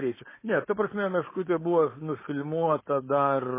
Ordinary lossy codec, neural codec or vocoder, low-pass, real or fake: MP3, 16 kbps; codec, 16 kHz, 4.8 kbps, FACodec; 3.6 kHz; fake